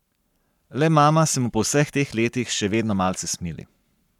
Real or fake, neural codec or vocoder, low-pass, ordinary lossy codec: fake; codec, 44.1 kHz, 7.8 kbps, Pupu-Codec; 19.8 kHz; none